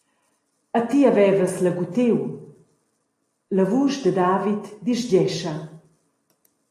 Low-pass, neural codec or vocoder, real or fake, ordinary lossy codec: 14.4 kHz; none; real; AAC, 48 kbps